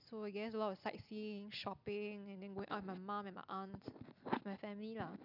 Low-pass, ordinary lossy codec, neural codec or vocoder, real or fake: 5.4 kHz; none; none; real